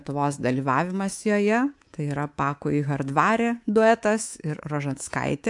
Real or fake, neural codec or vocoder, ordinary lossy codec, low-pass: fake; codec, 24 kHz, 3.1 kbps, DualCodec; AAC, 64 kbps; 10.8 kHz